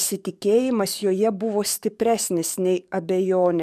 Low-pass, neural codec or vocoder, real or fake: 14.4 kHz; codec, 44.1 kHz, 7.8 kbps, DAC; fake